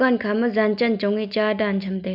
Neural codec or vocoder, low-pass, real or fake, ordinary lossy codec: none; 5.4 kHz; real; none